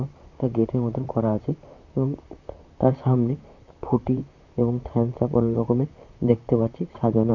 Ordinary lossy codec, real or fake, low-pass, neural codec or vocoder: none; fake; 7.2 kHz; vocoder, 44.1 kHz, 128 mel bands, Pupu-Vocoder